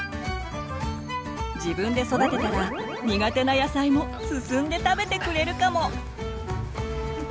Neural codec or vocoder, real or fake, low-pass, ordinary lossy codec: none; real; none; none